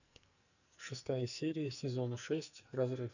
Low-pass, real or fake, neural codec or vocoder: 7.2 kHz; fake; codec, 44.1 kHz, 2.6 kbps, SNAC